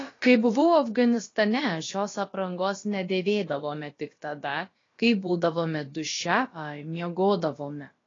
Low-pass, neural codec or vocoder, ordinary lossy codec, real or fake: 7.2 kHz; codec, 16 kHz, about 1 kbps, DyCAST, with the encoder's durations; AAC, 32 kbps; fake